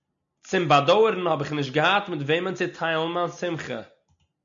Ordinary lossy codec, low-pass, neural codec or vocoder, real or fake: MP3, 64 kbps; 7.2 kHz; none; real